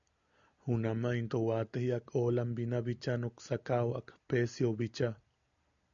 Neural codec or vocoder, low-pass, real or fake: none; 7.2 kHz; real